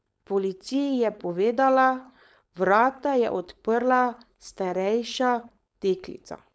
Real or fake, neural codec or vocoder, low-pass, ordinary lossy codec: fake; codec, 16 kHz, 4.8 kbps, FACodec; none; none